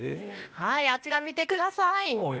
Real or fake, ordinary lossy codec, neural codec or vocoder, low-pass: fake; none; codec, 16 kHz, 0.8 kbps, ZipCodec; none